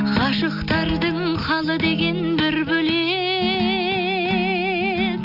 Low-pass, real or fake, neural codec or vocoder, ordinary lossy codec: 5.4 kHz; real; none; none